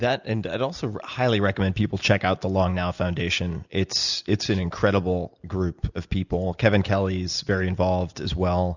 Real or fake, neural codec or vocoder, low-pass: real; none; 7.2 kHz